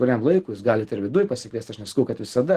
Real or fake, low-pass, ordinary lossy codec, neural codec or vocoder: real; 14.4 kHz; Opus, 16 kbps; none